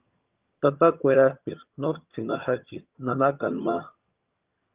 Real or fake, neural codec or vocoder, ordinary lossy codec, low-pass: fake; vocoder, 22.05 kHz, 80 mel bands, HiFi-GAN; Opus, 32 kbps; 3.6 kHz